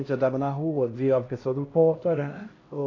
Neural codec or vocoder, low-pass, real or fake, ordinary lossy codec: codec, 16 kHz, 1 kbps, X-Codec, HuBERT features, trained on LibriSpeech; 7.2 kHz; fake; AAC, 32 kbps